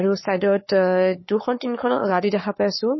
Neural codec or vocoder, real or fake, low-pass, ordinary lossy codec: codec, 44.1 kHz, 7.8 kbps, Pupu-Codec; fake; 7.2 kHz; MP3, 24 kbps